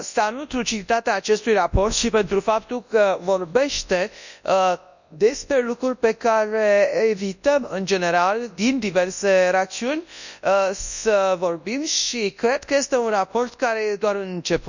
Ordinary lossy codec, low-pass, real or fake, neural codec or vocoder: none; 7.2 kHz; fake; codec, 24 kHz, 0.9 kbps, WavTokenizer, large speech release